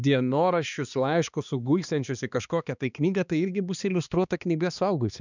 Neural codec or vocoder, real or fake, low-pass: codec, 16 kHz, 2 kbps, X-Codec, HuBERT features, trained on balanced general audio; fake; 7.2 kHz